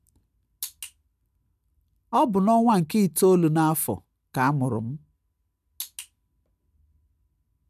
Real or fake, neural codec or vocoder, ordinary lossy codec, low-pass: real; none; none; 14.4 kHz